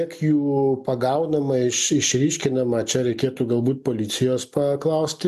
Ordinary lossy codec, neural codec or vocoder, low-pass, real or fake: MP3, 96 kbps; none; 14.4 kHz; real